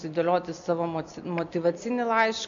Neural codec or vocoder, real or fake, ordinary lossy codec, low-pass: none; real; MP3, 96 kbps; 7.2 kHz